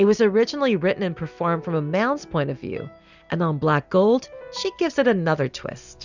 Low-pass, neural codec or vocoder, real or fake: 7.2 kHz; none; real